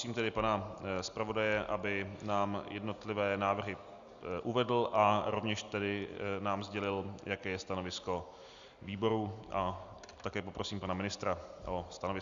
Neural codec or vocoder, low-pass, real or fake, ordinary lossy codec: none; 7.2 kHz; real; Opus, 64 kbps